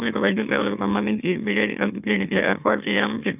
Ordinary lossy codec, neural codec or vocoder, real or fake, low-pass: AAC, 32 kbps; autoencoder, 44.1 kHz, a latent of 192 numbers a frame, MeloTTS; fake; 3.6 kHz